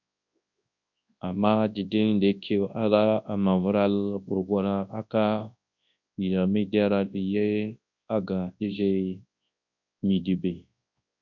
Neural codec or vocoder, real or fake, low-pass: codec, 24 kHz, 0.9 kbps, WavTokenizer, large speech release; fake; 7.2 kHz